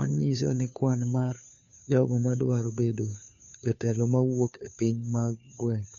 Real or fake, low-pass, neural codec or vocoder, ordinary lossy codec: fake; 7.2 kHz; codec, 16 kHz, 2 kbps, FunCodec, trained on Chinese and English, 25 frames a second; none